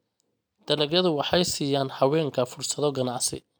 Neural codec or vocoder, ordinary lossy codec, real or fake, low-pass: none; none; real; none